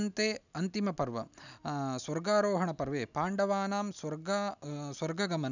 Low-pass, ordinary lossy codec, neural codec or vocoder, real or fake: 7.2 kHz; none; none; real